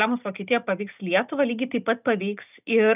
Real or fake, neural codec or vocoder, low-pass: real; none; 3.6 kHz